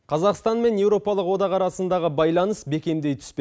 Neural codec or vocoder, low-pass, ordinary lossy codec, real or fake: none; none; none; real